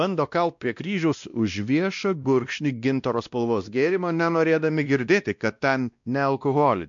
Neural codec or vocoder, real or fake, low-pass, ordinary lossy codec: codec, 16 kHz, 1 kbps, X-Codec, WavLM features, trained on Multilingual LibriSpeech; fake; 7.2 kHz; MP3, 64 kbps